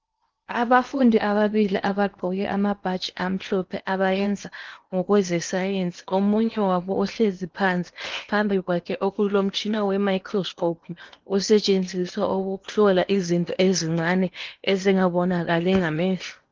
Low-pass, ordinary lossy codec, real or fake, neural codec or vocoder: 7.2 kHz; Opus, 32 kbps; fake; codec, 16 kHz in and 24 kHz out, 0.8 kbps, FocalCodec, streaming, 65536 codes